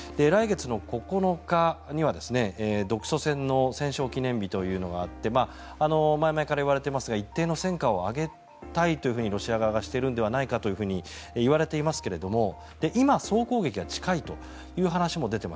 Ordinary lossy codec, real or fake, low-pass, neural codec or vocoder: none; real; none; none